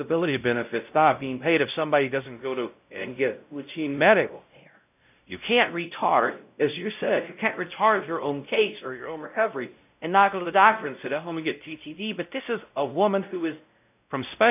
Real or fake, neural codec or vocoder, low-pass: fake; codec, 16 kHz, 0.5 kbps, X-Codec, WavLM features, trained on Multilingual LibriSpeech; 3.6 kHz